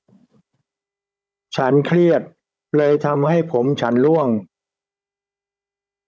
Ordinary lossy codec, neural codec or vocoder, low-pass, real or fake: none; codec, 16 kHz, 16 kbps, FunCodec, trained on Chinese and English, 50 frames a second; none; fake